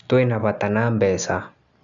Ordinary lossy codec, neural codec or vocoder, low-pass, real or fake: none; none; 7.2 kHz; real